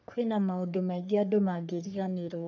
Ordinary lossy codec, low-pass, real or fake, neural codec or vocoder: none; 7.2 kHz; fake; codec, 44.1 kHz, 3.4 kbps, Pupu-Codec